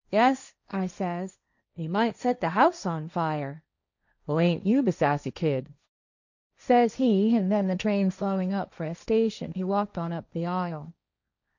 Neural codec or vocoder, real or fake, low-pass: codec, 16 kHz, 1.1 kbps, Voila-Tokenizer; fake; 7.2 kHz